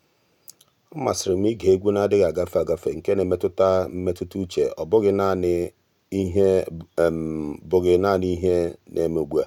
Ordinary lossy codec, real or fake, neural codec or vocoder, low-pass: none; real; none; 19.8 kHz